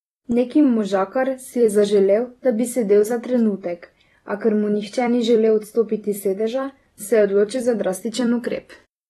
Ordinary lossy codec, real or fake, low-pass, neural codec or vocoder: AAC, 32 kbps; fake; 19.8 kHz; vocoder, 44.1 kHz, 128 mel bands every 256 samples, BigVGAN v2